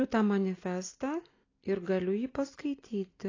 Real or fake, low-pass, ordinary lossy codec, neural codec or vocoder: real; 7.2 kHz; AAC, 32 kbps; none